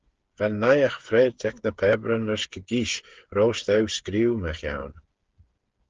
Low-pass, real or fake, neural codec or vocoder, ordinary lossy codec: 7.2 kHz; fake; codec, 16 kHz, 8 kbps, FreqCodec, smaller model; Opus, 16 kbps